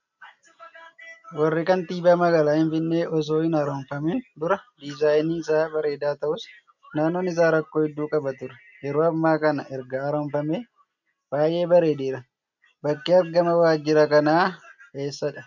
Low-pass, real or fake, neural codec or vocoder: 7.2 kHz; real; none